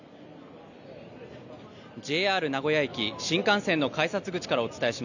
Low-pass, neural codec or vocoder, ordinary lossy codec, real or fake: 7.2 kHz; none; none; real